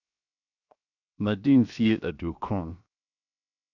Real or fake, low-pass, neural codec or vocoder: fake; 7.2 kHz; codec, 16 kHz, 0.7 kbps, FocalCodec